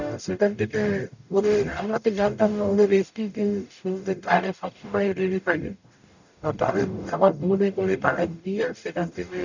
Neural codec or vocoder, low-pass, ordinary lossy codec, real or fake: codec, 44.1 kHz, 0.9 kbps, DAC; 7.2 kHz; none; fake